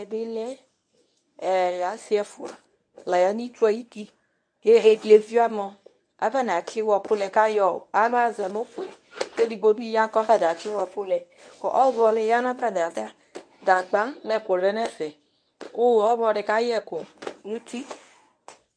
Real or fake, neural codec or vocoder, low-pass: fake; codec, 24 kHz, 0.9 kbps, WavTokenizer, medium speech release version 1; 9.9 kHz